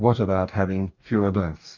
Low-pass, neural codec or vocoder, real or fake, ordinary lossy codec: 7.2 kHz; codec, 44.1 kHz, 2.6 kbps, DAC; fake; Opus, 64 kbps